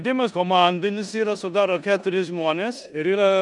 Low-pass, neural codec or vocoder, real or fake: 10.8 kHz; codec, 16 kHz in and 24 kHz out, 0.9 kbps, LongCat-Audio-Codec, four codebook decoder; fake